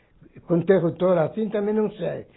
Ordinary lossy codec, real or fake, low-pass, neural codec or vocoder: AAC, 16 kbps; real; 7.2 kHz; none